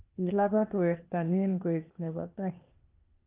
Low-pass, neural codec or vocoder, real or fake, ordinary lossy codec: 3.6 kHz; codec, 16 kHz, 0.8 kbps, ZipCodec; fake; Opus, 32 kbps